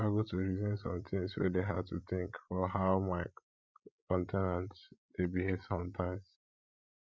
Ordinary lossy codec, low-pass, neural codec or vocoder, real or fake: none; none; codec, 16 kHz, 16 kbps, FreqCodec, larger model; fake